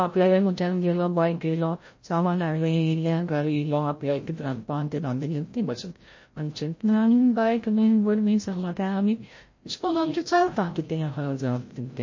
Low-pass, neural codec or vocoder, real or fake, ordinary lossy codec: 7.2 kHz; codec, 16 kHz, 0.5 kbps, FreqCodec, larger model; fake; MP3, 32 kbps